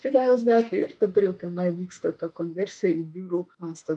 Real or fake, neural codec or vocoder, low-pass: fake; autoencoder, 48 kHz, 32 numbers a frame, DAC-VAE, trained on Japanese speech; 10.8 kHz